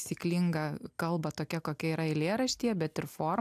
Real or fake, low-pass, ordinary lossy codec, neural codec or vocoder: real; 14.4 kHz; AAC, 96 kbps; none